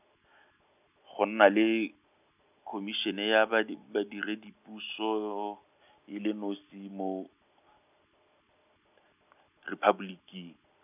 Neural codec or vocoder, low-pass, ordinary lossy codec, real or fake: none; 3.6 kHz; none; real